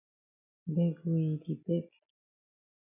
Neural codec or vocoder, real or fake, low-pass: none; real; 3.6 kHz